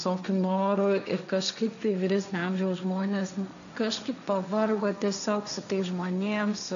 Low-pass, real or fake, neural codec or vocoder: 7.2 kHz; fake; codec, 16 kHz, 1.1 kbps, Voila-Tokenizer